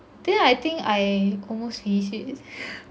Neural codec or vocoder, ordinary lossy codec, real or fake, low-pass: none; none; real; none